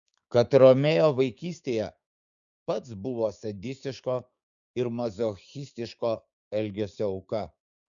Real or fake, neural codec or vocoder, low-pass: fake; codec, 16 kHz, 6 kbps, DAC; 7.2 kHz